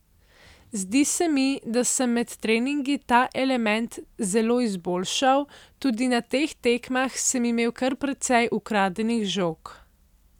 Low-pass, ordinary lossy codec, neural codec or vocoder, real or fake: 19.8 kHz; none; none; real